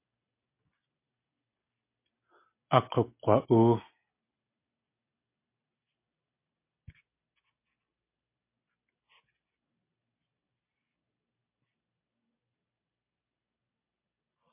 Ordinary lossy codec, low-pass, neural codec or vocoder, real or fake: MP3, 24 kbps; 3.6 kHz; none; real